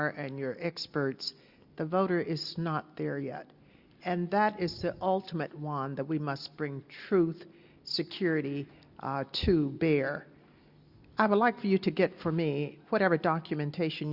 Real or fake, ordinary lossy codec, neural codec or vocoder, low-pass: real; Opus, 64 kbps; none; 5.4 kHz